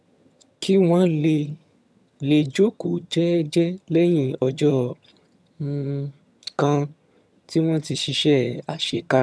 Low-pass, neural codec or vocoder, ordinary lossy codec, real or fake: none; vocoder, 22.05 kHz, 80 mel bands, HiFi-GAN; none; fake